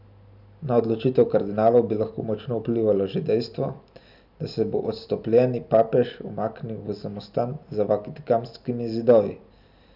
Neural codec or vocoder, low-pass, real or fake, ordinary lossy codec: none; 5.4 kHz; real; none